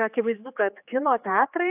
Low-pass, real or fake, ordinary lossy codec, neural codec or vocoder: 3.6 kHz; fake; AAC, 32 kbps; codec, 16 kHz, 4 kbps, X-Codec, HuBERT features, trained on general audio